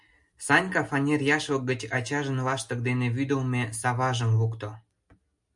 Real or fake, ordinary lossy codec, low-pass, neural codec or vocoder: real; MP3, 96 kbps; 10.8 kHz; none